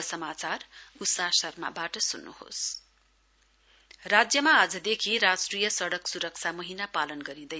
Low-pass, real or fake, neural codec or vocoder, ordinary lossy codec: none; real; none; none